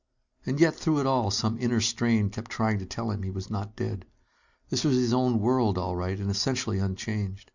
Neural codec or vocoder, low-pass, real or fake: none; 7.2 kHz; real